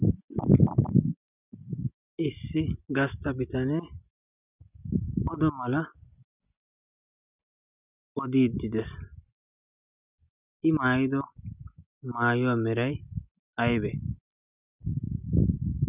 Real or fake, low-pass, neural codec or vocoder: real; 3.6 kHz; none